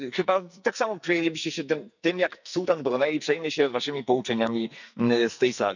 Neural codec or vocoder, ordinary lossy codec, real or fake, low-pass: codec, 44.1 kHz, 2.6 kbps, SNAC; none; fake; 7.2 kHz